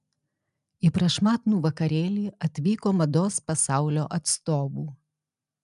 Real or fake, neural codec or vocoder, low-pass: real; none; 10.8 kHz